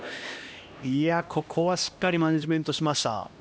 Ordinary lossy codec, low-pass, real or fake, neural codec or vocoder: none; none; fake; codec, 16 kHz, 1 kbps, X-Codec, HuBERT features, trained on LibriSpeech